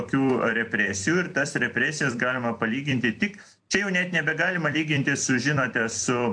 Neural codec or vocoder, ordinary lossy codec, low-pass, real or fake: vocoder, 44.1 kHz, 128 mel bands every 256 samples, BigVGAN v2; AAC, 64 kbps; 9.9 kHz; fake